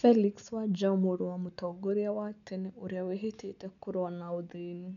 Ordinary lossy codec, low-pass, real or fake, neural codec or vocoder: none; 7.2 kHz; real; none